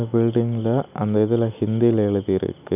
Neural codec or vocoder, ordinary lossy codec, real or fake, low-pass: none; none; real; 3.6 kHz